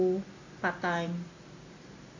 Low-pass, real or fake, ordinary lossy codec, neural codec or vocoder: 7.2 kHz; real; AAC, 48 kbps; none